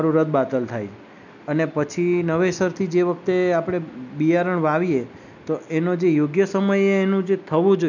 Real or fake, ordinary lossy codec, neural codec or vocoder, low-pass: real; none; none; 7.2 kHz